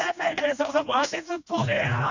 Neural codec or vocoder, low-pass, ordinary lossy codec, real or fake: codec, 16 kHz, 1 kbps, FreqCodec, smaller model; 7.2 kHz; none; fake